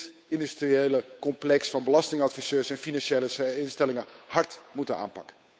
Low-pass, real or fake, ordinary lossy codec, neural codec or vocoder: none; fake; none; codec, 16 kHz, 8 kbps, FunCodec, trained on Chinese and English, 25 frames a second